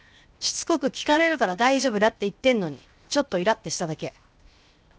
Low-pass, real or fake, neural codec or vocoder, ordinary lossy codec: none; fake; codec, 16 kHz, 0.7 kbps, FocalCodec; none